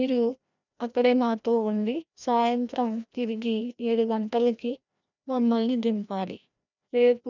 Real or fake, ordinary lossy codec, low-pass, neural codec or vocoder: fake; none; 7.2 kHz; codec, 16 kHz, 1 kbps, FreqCodec, larger model